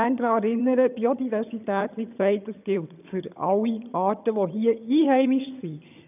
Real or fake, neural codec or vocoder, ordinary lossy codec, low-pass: fake; vocoder, 22.05 kHz, 80 mel bands, HiFi-GAN; none; 3.6 kHz